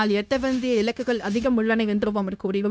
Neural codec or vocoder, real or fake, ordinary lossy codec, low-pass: codec, 16 kHz, 0.9 kbps, LongCat-Audio-Codec; fake; none; none